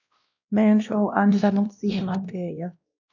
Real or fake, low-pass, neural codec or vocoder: fake; 7.2 kHz; codec, 16 kHz, 1 kbps, X-Codec, WavLM features, trained on Multilingual LibriSpeech